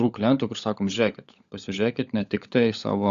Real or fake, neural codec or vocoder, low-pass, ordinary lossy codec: fake; codec, 16 kHz, 16 kbps, FunCodec, trained on LibriTTS, 50 frames a second; 7.2 kHz; Opus, 64 kbps